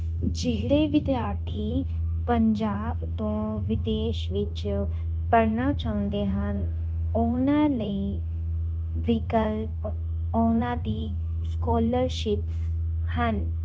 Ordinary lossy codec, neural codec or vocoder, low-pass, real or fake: none; codec, 16 kHz, 0.9 kbps, LongCat-Audio-Codec; none; fake